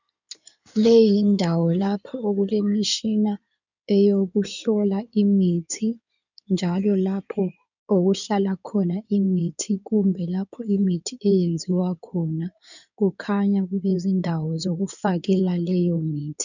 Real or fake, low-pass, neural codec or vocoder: fake; 7.2 kHz; codec, 16 kHz in and 24 kHz out, 2.2 kbps, FireRedTTS-2 codec